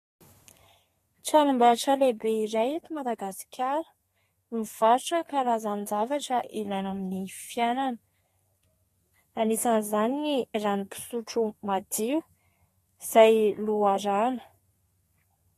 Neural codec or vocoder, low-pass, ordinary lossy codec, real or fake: codec, 32 kHz, 1.9 kbps, SNAC; 14.4 kHz; AAC, 48 kbps; fake